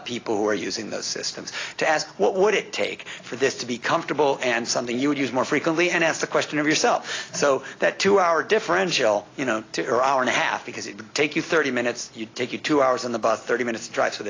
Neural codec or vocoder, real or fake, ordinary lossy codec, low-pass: none; real; AAC, 32 kbps; 7.2 kHz